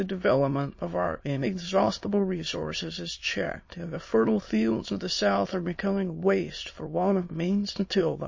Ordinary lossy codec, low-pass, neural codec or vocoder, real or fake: MP3, 32 kbps; 7.2 kHz; autoencoder, 22.05 kHz, a latent of 192 numbers a frame, VITS, trained on many speakers; fake